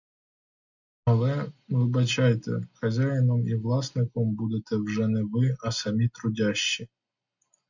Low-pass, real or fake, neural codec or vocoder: 7.2 kHz; real; none